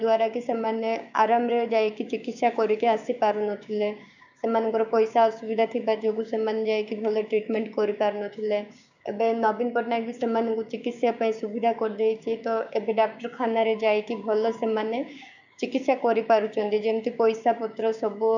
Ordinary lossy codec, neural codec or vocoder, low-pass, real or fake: none; codec, 16 kHz, 6 kbps, DAC; 7.2 kHz; fake